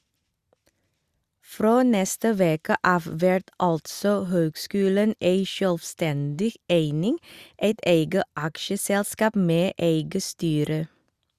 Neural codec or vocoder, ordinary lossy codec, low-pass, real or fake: none; Opus, 64 kbps; 14.4 kHz; real